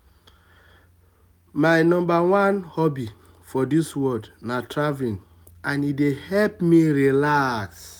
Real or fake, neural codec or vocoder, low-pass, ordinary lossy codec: real; none; none; none